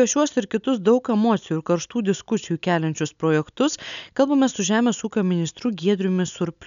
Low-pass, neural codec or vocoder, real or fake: 7.2 kHz; none; real